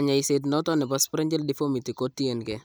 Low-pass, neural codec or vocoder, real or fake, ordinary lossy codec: none; none; real; none